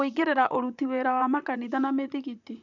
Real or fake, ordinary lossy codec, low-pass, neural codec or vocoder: fake; none; 7.2 kHz; vocoder, 44.1 kHz, 128 mel bands, Pupu-Vocoder